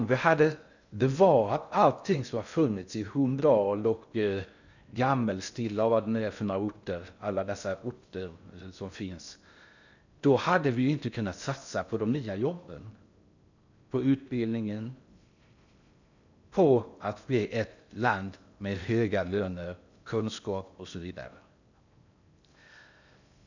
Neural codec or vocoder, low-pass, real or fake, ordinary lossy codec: codec, 16 kHz in and 24 kHz out, 0.6 kbps, FocalCodec, streaming, 2048 codes; 7.2 kHz; fake; none